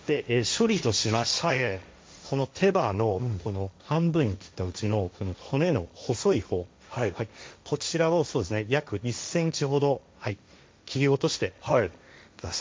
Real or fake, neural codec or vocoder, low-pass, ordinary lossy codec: fake; codec, 16 kHz, 1.1 kbps, Voila-Tokenizer; none; none